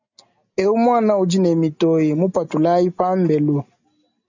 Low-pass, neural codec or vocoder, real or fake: 7.2 kHz; none; real